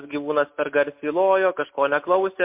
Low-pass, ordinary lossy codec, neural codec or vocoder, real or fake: 3.6 kHz; MP3, 32 kbps; none; real